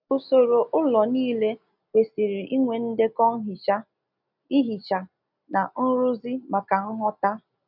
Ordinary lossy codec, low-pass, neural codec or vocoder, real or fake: none; 5.4 kHz; none; real